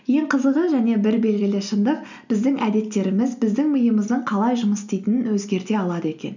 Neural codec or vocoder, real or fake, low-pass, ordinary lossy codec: none; real; 7.2 kHz; none